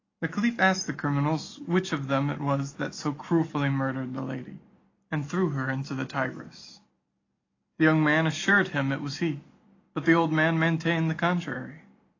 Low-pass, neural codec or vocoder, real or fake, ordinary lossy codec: 7.2 kHz; none; real; AAC, 32 kbps